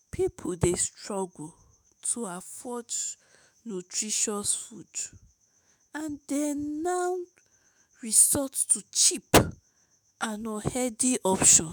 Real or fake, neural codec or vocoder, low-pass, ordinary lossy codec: fake; autoencoder, 48 kHz, 128 numbers a frame, DAC-VAE, trained on Japanese speech; none; none